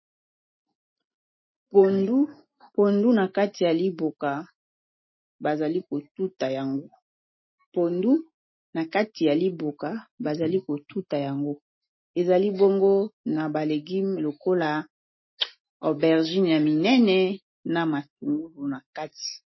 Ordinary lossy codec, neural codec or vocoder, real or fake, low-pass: MP3, 24 kbps; none; real; 7.2 kHz